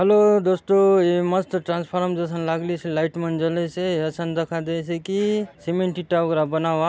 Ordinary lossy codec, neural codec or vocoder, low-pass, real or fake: none; none; none; real